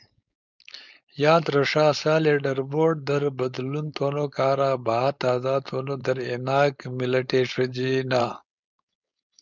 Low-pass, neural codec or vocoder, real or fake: 7.2 kHz; codec, 16 kHz, 4.8 kbps, FACodec; fake